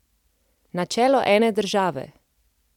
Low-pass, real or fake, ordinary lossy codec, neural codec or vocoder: 19.8 kHz; real; none; none